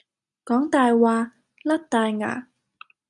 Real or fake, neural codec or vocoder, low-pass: real; none; 10.8 kHz